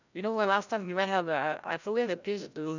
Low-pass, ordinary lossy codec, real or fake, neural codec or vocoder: 7.2 kHz; none; fake; codec, 16 kHz, 0.5 kbps, FreqCodec, larger model